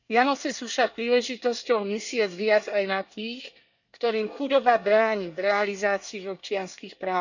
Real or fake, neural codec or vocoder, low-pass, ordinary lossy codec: fake; codec, 24 kHz, 1 kbps, SNAC; 7.2 kHz; none